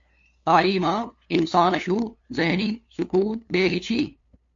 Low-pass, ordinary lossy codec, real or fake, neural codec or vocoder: 7.2 kHz; AAC, 48 kbps; fake; codec, 16 kHz, 2 kbps, FunCodec, trained on LibriTTS, 25 frames a second